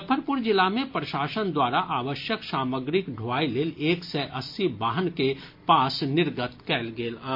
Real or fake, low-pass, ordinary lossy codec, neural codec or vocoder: real; 5.4 kHz; MP3, 32 kbps; none